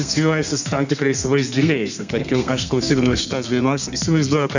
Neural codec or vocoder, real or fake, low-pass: codec, 32 kHz, 1.9 kbps, SNAC; fake; 7.2 kHz